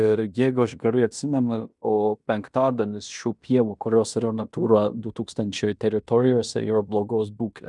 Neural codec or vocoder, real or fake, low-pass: codec, 16 kHz in and 24 kHz out, 0.9 kbps, LongCat-Audio-Codec, fine tuned four codebook decoder; fake; 10.8 kHz